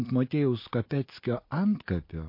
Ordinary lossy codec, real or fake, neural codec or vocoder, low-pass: MP3, 32 kbps; real; none; 5.4 kHz